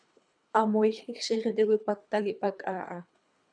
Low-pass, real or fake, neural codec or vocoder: 9.9 kHz; fake; codec, 24 kHz, 3 kbps, HILCodec